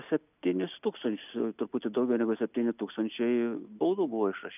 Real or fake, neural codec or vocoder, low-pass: real; none; 3.6 kHz